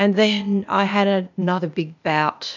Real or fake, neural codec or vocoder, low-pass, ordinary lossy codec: fake; codec, 16 kHz, 0.8 kbps, ZipCodec; 7.2 kHz; MP3, 64 kbps